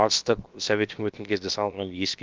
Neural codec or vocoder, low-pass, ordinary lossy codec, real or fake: codec, 16 kHz, about 1 kbps, DyCAST, with the encoder's durations; 7.2 kHz; Opus, 24 kbps; fake